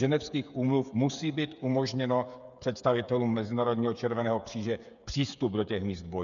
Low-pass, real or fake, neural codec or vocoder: 7.2 kHz; fake; codec, 16 kHz, 8 kbps, FreqCodec, smaller model